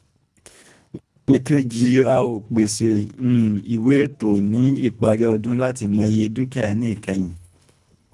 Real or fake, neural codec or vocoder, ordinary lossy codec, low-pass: fake; codec, 24 kHz, 1.5 kbps, HILCodec; none; none